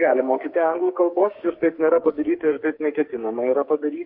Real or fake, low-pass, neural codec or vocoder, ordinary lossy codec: fake; 5.4 kHz; codec, 44.1 kHz, 2.6 kbps, SNAC; MP3, 32 kbps